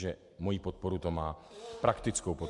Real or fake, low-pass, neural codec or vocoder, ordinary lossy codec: real; 10.8 kHz; none; MP3, 64 kbps